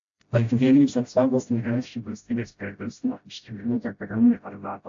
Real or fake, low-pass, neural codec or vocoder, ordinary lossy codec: fake; 7.2 kHz; codec, 16 kHz, 0.5 kbps, FreqCodec, smaller model; AAC, 48 kbps